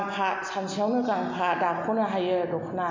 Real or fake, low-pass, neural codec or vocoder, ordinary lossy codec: real; 7.2 kHz; none; MP3, 48 kbps